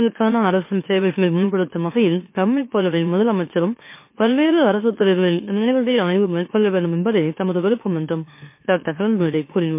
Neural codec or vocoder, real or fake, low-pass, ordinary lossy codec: autoencoder, 44.1 kHz, a latent of 192 numbers a frame, MeloTTS; fake; 3.6 kHz; MP3, 24 kbps